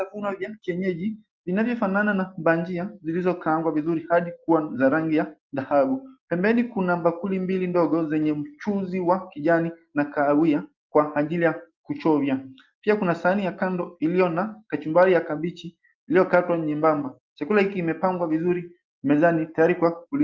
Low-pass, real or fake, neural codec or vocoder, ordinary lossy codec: 7.2 kHz; real; none; Opus, 24 kbps